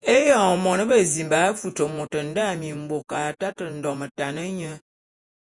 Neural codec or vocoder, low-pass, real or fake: vocoder, 48 kHz, 128 mel bands, Vocos; 10.8 kHz; fake